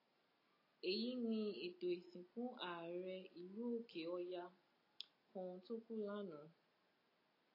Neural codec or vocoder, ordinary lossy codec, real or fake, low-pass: none; AAC, 24 kbps; real; 5.4 kHz